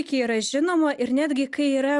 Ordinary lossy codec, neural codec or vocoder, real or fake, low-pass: Opus, 64 kbps; none; real; 10.8 kHz